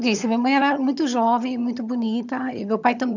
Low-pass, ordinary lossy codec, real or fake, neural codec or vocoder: 7.2 kHz; none; fake; vocoder, 22.05 kHz, 80 mel bands, HiFi-GAN